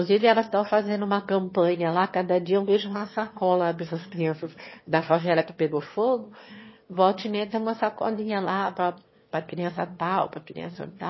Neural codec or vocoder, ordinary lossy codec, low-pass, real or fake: autoencoder, 22.05 kHz, a latent of 192 numbers a frame, VITS, trained on one speaker; MP3, 24 kbps; 7.2 kHz; fake